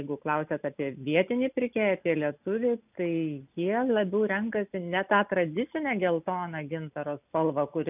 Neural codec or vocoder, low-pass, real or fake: none; 3.6 kHz; real